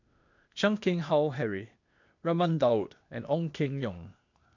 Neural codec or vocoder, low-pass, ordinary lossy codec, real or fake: codec, 16 kHz, 0.8 kbps, ZipCodec; 7.2 kHz; none; fake